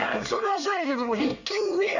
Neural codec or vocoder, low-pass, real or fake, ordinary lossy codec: codec, 24 kHz, 1 kbps, SNAC; 7.2 kHz; fake; none